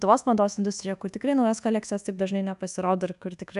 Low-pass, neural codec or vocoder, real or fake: 10.8 kHz; codec, 24 kHz, 1.2 kbps, DualCodec; fake